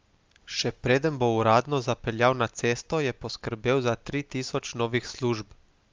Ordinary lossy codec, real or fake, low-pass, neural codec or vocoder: Opus, 32 kbps; real; 7.2 kHz; none